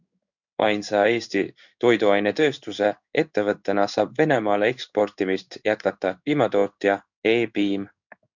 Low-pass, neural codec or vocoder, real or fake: 7.2 kHz; codec, 16 kHz in and 24 kHz out, 1 kbps, XY-Tokenizer; fake